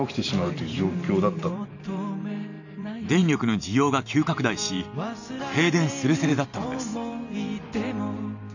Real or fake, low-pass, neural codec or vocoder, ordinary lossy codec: fake; 7.2 kHz; vocoder, 44.1 kHz, 128 mel bands every 512 samples, BigVGAN v2; none